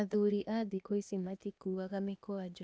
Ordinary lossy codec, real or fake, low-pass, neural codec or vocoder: none; fake; none; codec, 16 kHz, 0.8 kbps, ZipCodec